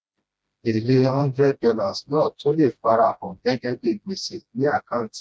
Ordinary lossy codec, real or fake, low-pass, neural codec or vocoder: none; fake; none; codec, 16 kHz, 1 kbps, FreqCodec, smaller model